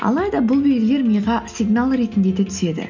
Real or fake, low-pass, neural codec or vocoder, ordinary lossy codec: real; 7.2 kHz; none; none